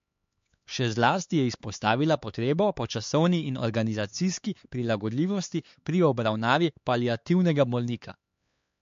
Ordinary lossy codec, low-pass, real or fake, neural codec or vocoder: MP3, 48 kbps; 7.2 kHz; fake; codec, 16 kHz, 4 kbps, X-Codec, HuBERT features, trained on LibriSpeech